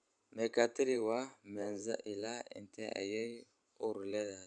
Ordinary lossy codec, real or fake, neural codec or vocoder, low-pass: none; fake; vocoder, 44.1 kHz, 128 mel bands every 512 samples, BigVGAN v2; 9.9 kHz